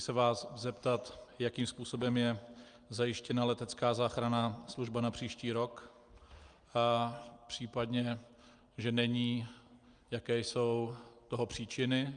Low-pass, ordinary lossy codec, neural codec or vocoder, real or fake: 9.9 kHz; Opus, 32 kbps; none; real